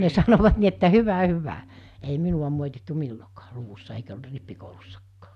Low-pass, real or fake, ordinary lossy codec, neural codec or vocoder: 14.4 kHz; real; none; none